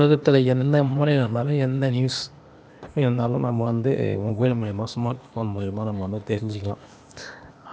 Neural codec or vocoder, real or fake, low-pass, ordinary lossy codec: codec, 16 kHz, 0.8 kbps, ZipCodec; fake; none; none